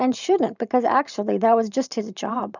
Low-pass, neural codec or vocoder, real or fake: 7.2 kHz; vocoder, 44.1 kHz, 128 mel bands every 512 samples, BigVGAN v2; fake